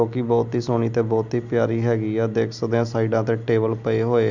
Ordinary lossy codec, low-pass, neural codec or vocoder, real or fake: none; 7.2 kHz; none; real